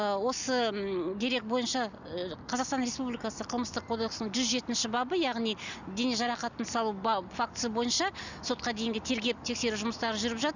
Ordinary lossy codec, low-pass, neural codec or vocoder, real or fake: none; 7.2 kHz; none; real